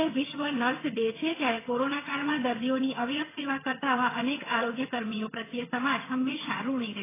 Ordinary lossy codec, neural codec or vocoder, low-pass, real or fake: AAC, 16 kbps; vocoder, 22.05 kHz, 80 mel bands, HiFi-GAN; 3.6 kHz; fake